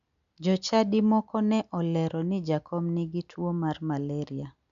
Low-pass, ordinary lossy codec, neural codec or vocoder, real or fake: 7.2 kHz; MP3, 64 kbps; none; real